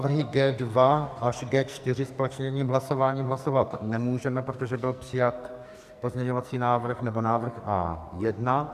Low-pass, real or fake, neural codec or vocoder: 14.4 kHz; fake; codec, 44.1 kHz, 2.6 kbps, SNAC